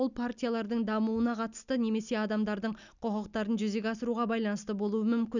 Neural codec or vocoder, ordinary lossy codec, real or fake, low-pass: none; none; real; 7.2 kHz